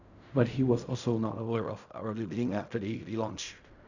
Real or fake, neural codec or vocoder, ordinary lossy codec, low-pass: fake; codec, 16 kHz in and 24 kHz out, 0.4 kbps, LongCat-Audio-Codec, fine tuned four codebook decoder; none; 7.2 kHz